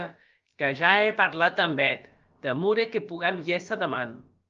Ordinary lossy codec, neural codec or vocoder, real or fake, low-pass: Opus, 24 kbps; codec, 16 kHz, about 1 kbps, DyCAST, with the encoder's durations; fake; 7.2 kHz